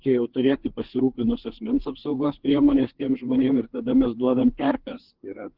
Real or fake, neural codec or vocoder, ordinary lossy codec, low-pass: fake; codec, 16 kHz, 4 kbps, FreqCodec, larger model; Opus, 16 kbps; 5.4 kHz